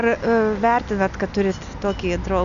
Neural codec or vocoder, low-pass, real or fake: none; 7.2 kHz; real